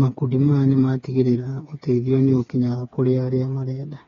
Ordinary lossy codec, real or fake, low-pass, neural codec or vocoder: AAC, 32 kbps; fake; 7.2 kHz; codec, 16 kHz, 4 kbps, FreqCodec, smaller model